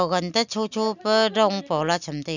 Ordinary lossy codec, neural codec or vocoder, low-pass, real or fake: none; none; 7.2 kHz; real